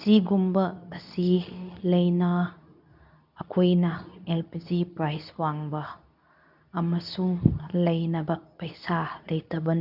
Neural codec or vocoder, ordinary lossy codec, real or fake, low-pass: codec, 24 kHz, 0.9 kbps, WavTokenizer, medium speech release version 2; none; fake; 5.4 kHz